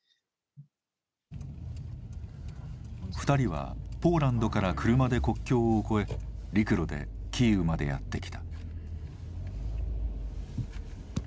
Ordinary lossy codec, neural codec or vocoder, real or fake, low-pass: none; none; real; none